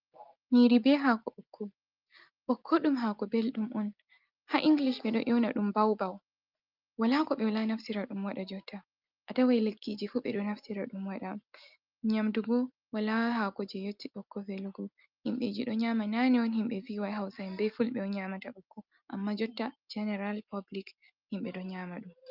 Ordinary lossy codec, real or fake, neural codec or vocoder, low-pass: Opus, 24 kbps; real; none; 5.4 kHz